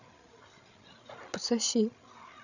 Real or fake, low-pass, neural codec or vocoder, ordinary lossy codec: fake; 7.2 kHz; codec, 16 kHz, 16 kbps, FreqCodec, larger model; none